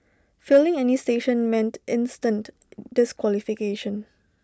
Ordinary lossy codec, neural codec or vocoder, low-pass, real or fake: none; none; none; real